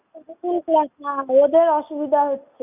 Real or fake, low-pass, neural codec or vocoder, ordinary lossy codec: real; 3.6 kHz; none; none